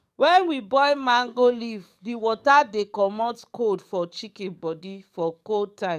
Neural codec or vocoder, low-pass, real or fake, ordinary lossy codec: codec, 44.1 kHz, 7.8 kbps, DAC; 14.4 kHz; fake; none